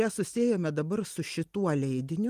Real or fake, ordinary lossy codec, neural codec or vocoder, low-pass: real; Opus, 24 kbps; none; 14.4 kHz